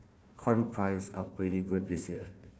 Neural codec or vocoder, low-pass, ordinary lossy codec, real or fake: codec, 16 kHz, 1 kbps, FunCodec, trained on Chinese and English, 50 frames a second; none; none; fake